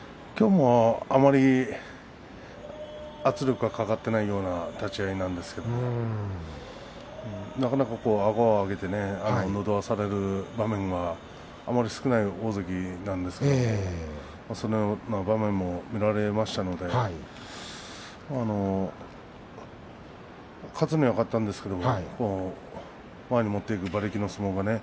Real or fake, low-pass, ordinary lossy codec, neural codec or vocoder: real; none; none; none